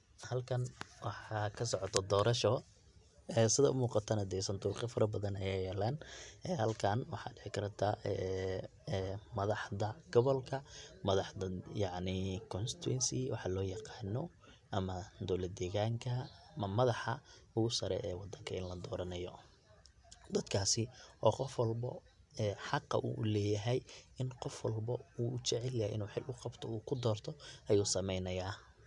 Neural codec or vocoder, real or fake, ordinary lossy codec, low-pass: none; real; none; 10.8 kHz